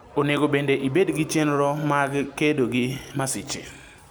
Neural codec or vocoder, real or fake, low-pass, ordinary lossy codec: none; real; none; none